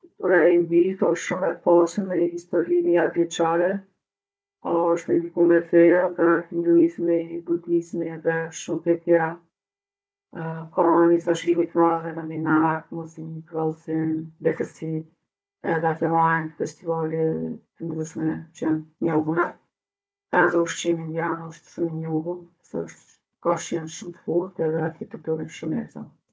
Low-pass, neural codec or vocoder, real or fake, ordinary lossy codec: none; codec, 16 kHz, 4 kbps, FunCodec, trained on Chinese and English, 50 frames a second; fake; none